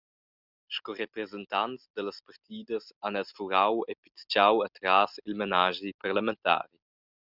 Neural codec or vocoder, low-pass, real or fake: none; 5.4 kHz; real